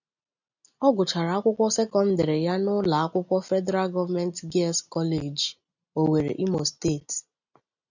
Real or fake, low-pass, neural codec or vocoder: real; 7.2 kHz; none